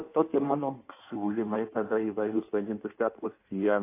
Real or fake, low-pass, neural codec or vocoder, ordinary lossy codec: fake; 3.6 kHz; codec, 16 kHz in and 24 kHz out, 1.1 kbps, FireRedTTS-2 codec; AAC, 24 kbps